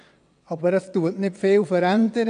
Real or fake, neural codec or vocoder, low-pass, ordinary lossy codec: fake; vocoder, 22.05 kHz, 80 mel bands, WaveNeXt; 9.9 kHz; AAC, 64 kbps